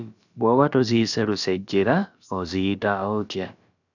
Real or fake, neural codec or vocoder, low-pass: fake; codec, 16 kHz, about 1 kbps, DyCAST, with the encoder's durations; 7.2 kHz